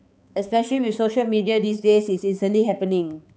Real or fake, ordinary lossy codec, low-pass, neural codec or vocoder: fake; none; none; codec, 16 kHz, 4 kbps, X-Codec, HuBERT features, trained on balanced general audio